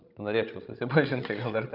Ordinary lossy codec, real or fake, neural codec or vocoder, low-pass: Opus, 24 kbps; fake; codec, 16 kHz, 16 kbps, FreqCodec, larger model; 5.4 kHz